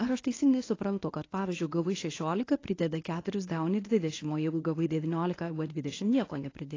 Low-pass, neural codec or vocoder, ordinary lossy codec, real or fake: 7.2 kHz; codec, 24 kHz, 0.9 kbps, WavTokenizer, medium speech release version 1; AAC, 32 kbps; fake